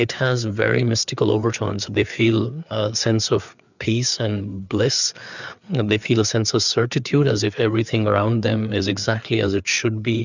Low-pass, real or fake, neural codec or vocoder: 7.2 kHz; fake; codec, 16 kHz, 4 kbps, FreqCodec, larger model